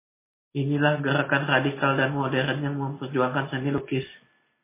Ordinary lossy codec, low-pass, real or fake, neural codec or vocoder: MP3, 16 kbps; 3.6 kHz; real; none